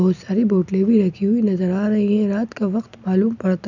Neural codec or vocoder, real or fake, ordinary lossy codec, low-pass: none; real; none; 7.2 kHz